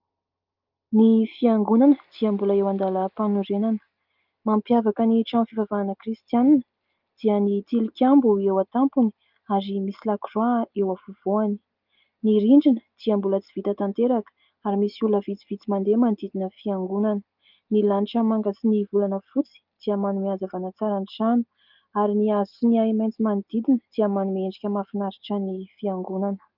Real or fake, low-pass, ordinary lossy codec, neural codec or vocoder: real; 5.4 kHz; Opus, 32 kbps; none